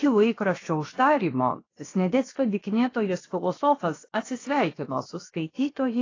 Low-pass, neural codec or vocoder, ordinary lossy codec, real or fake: 7.2 kHz; codec, 16 kHz, 0.7 kbps, FocalCodec; AAC, 32 kbps; fake